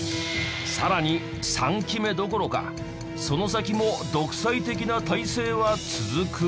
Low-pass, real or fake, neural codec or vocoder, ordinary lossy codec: none; real; none; none